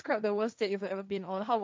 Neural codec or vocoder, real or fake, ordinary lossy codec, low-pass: codec, 16 kHz, 1.1 kbps, Voila-Tokenizer; fake; none; none